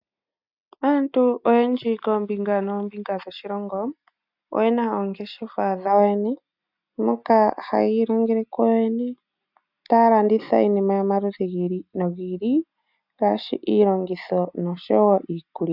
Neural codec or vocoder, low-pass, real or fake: none; 5.4 kHz; real